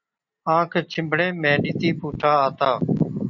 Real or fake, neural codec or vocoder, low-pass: real; none; 7.2 kHz